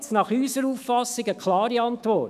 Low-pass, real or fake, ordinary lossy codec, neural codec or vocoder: 14.4 kHz; fake; none; autoencoder, 48 kHz, 128 numbers a frame, DAC-VAE, trained on Japanese speech